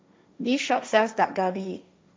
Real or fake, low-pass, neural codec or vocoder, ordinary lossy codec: fake; none; codec, 16 kHz, 1.1 kbps, Voila-Tokenizer; none